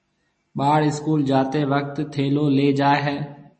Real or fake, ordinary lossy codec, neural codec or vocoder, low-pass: real; MP3, 32 kbps; none; 10.8 kHz